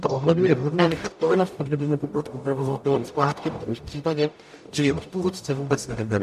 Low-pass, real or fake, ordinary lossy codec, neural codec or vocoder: 14.4 kHz; fake; MP3, 64 kbps; codec, 44.1 kHz, 0.9 kbps, DAC